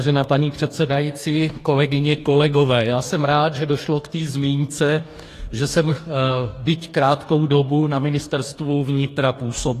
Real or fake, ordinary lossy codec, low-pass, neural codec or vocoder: fake; AAC, 48 kbps; 14.4 kHz; codec, 44.1 kHz, 2.6 kbps, DAC